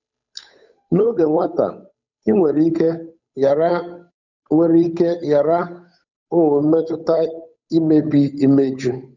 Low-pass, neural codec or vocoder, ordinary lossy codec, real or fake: 7.2 kHz; codec, 16 kHz, 8 kbps, FunCodec, trained on Chinese and English, 25 frames a second; none; fake